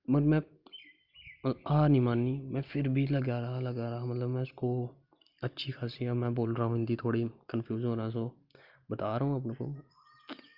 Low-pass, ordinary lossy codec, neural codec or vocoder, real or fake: 5.4 kHz; Opus, 24 kbps; none; real